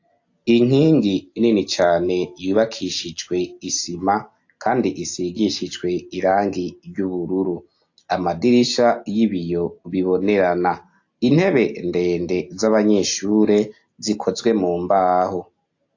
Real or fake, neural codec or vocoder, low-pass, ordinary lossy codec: real; none; 7.2 kHz; AAC, 48 kbps